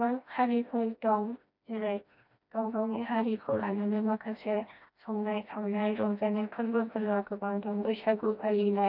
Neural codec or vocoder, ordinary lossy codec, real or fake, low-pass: codec, 16 kHz, 1 kbps, FreqCodec, smaller model; none; fake; 5.4 kHz